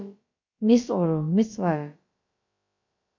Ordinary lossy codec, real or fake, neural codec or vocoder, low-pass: MP3, 48 kbps; fake; codec, 16 kHz, about 1 kbps, DyCAST, with the encoder's durations; 7.2 kHz